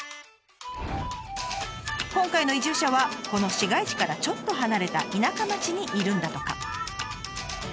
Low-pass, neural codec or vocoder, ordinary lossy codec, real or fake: none; none; none; real